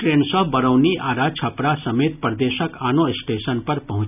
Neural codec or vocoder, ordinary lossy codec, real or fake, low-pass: none; none; real; 3.6 kHz